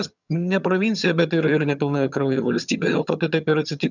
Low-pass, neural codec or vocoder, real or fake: 7.2 kHz; vocoder, 22.05 kHz, 80 mel bands, HiFi-GAN; fake